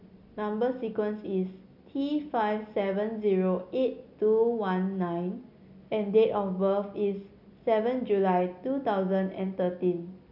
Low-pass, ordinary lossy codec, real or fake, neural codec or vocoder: 5.4 kHz; none; real; none